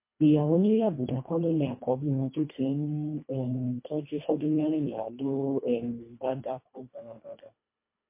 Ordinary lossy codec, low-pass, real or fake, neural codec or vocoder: MP3, 24 kbps; 3.6 kHz; fake; codec, 24 kHz, 1.5 kbps, HILCodec